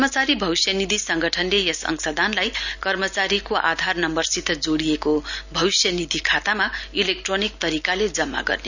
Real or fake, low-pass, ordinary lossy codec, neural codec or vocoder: real; 7.2 kHz; none; none